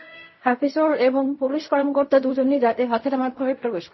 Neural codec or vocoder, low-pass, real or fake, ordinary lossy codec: codec, 16 kHz in and 24 kHz out, 0.4 kbps, LongCat-Audio-Codec, fine tuned four codebook decoder; 7.2 kHz; fake; MP3, 24 kbps